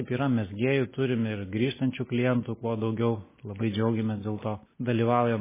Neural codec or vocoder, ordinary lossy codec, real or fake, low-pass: none; MP3, 16 kbps; real; 3.6 kHz